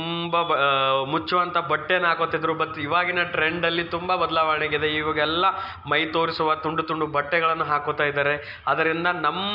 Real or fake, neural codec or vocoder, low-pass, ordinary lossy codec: real; none; 5.4 kHz; none